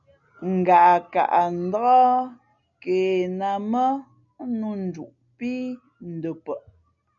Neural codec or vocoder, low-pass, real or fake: none; 7.2 kHz; real